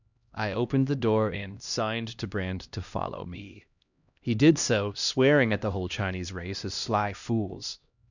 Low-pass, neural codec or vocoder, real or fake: 7.2 kHz; codec, 16 kHz, 1 kbps, X-Codec, HuBERT features, trained on LibriSpeech; fake